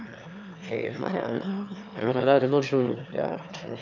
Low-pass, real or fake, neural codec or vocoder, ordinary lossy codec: 7.2 kHz; fake; autoencoder, 22.05 kHz, a latent of 192 numbers a frame, VITS, trained on one speaker; none